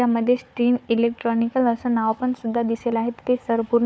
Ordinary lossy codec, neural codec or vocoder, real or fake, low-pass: none; codec, 16 kHz, 4 kbps, FunCodec, trained on Chinese and English, 50 frames a second; fake; none